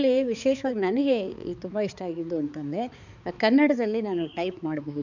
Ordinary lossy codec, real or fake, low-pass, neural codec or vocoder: none; fake; 7.2 kHz; codec, 16 kHz, 4 kbps, X-Codec, HuBERT features, trained on balanced general audio